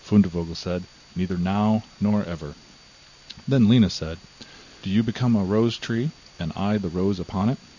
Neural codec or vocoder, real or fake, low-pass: none; real; 7.2 kHz